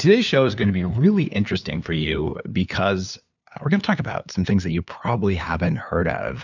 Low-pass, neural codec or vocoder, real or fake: 7.2 kHz; codec, 16 kHz, 2 kbps, FunCodec, trained on LibriTTS, 25 frames a second; fake